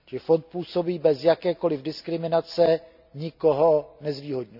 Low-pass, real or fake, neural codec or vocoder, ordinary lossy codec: 5.4 kHz; real; none; none